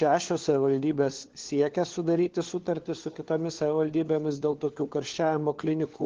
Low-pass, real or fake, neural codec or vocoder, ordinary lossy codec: 7.2 kHz; fake; codec, 16 kHz, 4 kbps, FunCodec, trained on LibriTTS, 50 frames a second; Opus, 16 kbps